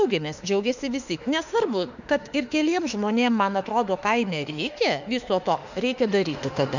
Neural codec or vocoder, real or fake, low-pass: autoencoder, 48 kHz, 32 numbers a frame, DAC-VAE, trained on Japanese speech; fake; 7.2 kHz